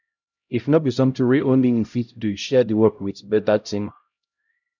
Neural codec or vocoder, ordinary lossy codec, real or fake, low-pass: codec, 16 kHz, 0.5 kbps, X-Codec, HuBERT features, trained on LibriSpeech; none; fake; 7.2 kHz